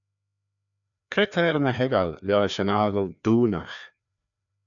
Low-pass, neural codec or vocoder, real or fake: 7.2 kHz; codec, 16 kHz, 2 kbps, FreqCodec, larger model; fake